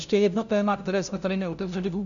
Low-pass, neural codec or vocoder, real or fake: 7.2 kHz; codec, 16 kHz, 0.5 kbps, FunCodec, trained on LibriTTS, 25 frames a second; fake